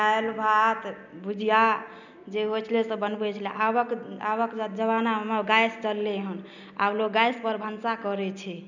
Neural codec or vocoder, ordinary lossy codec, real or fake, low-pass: none; none; real; 7.2 kHz